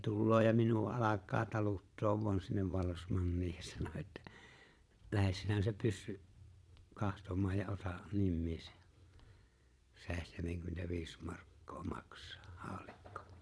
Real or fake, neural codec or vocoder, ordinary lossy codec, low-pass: fake; vocoder, 22.05 kHz, 80 mel bands, Vocos; none; none